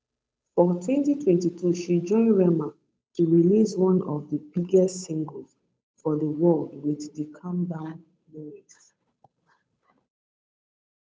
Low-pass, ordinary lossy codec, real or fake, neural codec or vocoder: none; none; fake; codec, 16 kHz, 8 kbps, FunCodec, trained on Chinese and English, 25 frames a second